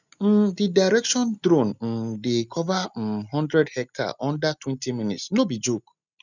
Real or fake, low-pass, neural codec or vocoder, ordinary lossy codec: real; 7.2 kHz; none; none